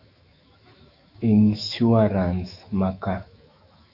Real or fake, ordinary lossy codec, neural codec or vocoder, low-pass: fake; Opus, 64 kbps; autoencoder, 48 kHz, 128 numbers a frame, DAC-VAE, trained on Japanese speech; 5.4 kHz